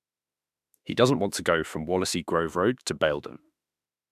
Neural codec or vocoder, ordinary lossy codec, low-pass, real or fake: autoencoder, 48 kHz, 32 numbers a frame, DAC-VAE, trained on Japanese speech; none; 14.4 kHz; fake